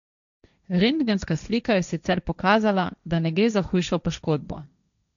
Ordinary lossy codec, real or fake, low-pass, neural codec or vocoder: none; fake; 7.2 kHz; codec, 16 kHz, 1.1 kbps, Voila-Tokenizer